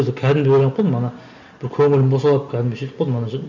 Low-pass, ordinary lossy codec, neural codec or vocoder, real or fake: 7.2 kHz; none; none; real